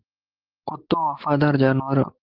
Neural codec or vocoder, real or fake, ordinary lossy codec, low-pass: none; real; Opus, 16 kbps; 5.4 kHz